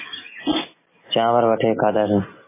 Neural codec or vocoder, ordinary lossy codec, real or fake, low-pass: none; MP3, 16 kbps; real; 3.6 kHz